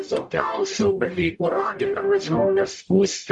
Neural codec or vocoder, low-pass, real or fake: codec, 44.1 kHz, 0.9 kbps, DAC; 10.8 kHz; fake